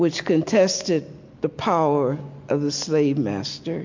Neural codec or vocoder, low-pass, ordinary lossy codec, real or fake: none; 7.2 kHz; MP3, 48 kbps; real